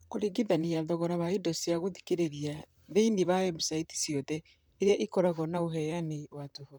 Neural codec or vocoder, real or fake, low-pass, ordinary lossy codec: vocoder, 44.1 kHz, 128 mel bands, Pupu-Vocoder; fake; none; none